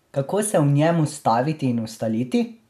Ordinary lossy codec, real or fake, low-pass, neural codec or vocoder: none; real; 14.4 kHz; none